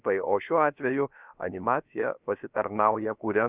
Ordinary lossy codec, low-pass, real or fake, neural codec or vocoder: Opus, 64 kbps; 3.6 kHz; fake; codec, 16 kHz, about 1 kbps, DyCAST, with the encoder's durations